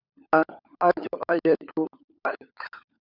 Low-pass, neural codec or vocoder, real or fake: 5.4 kHz; codec, 16 kHz, 16 kbps, FunCodec, trained on LibriTTS, 50 frames a second; fake